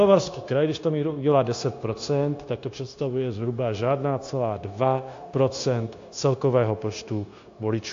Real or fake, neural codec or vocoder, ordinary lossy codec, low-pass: fake; codec, 16 kHz, 0.9 kbps, LongCat-Audio-Codec; AAC, 48 kbps; 7.2 kHz